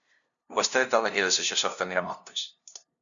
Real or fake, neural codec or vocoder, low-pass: fake; codec, 16 kHz, 0.5 kbps, FunCodec, trained on LibriTTS, 25 frames a second; 7.2 kHz